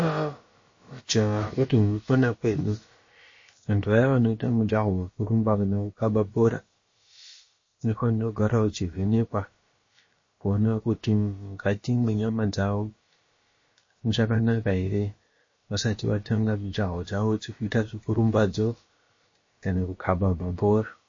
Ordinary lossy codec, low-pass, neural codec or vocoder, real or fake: MP3, 32 kbps; 7.2 kHz; codec, 16 kHz, about 1 kbps, DyCAST, with the encoder's durations; fake